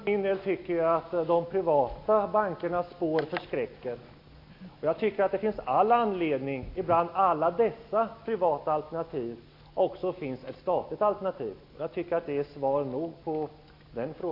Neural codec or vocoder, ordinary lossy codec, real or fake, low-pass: none; AAC, 32 kbps; real; 5.4 kHz